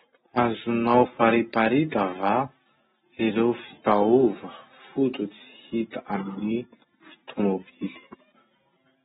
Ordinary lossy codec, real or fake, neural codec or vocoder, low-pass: AAC, 16 kbps; real; none; 7.2 kHz